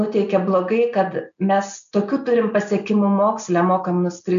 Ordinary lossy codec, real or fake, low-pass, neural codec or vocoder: MP3, 64 kbps; real; 7.2 kHz; none